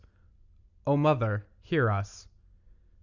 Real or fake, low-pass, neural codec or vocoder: real; 7.2 kHz; none